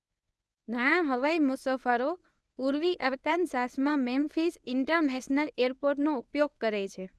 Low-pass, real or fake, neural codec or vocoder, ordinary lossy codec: none; fake; codec, 24 kHz, 0.9 kbps, WavTokenizer, medium speech release version 1; none